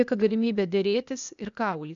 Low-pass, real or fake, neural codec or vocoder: 7.2 kHz; fake; codec, 16 kHz, 0.8 kbps, ZipCodec